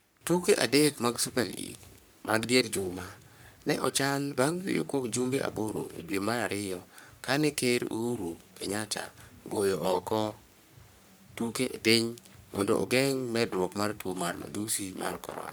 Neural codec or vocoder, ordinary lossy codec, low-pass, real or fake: codec, 44.1 kHz, 3.4 kbps, Pupu-Codec; none; none; fake